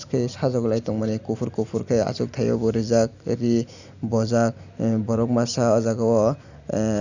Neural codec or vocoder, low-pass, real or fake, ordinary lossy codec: none; 7.2 kHz; real; none